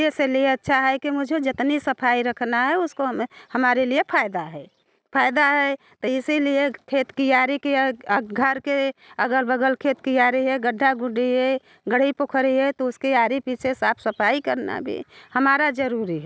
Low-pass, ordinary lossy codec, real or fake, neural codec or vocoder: none; none; real; none